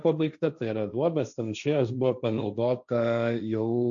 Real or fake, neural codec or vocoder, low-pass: fake; codec, 16 kHz, 1.1 kbps, Voila-Tokenizer; 7.2 kHz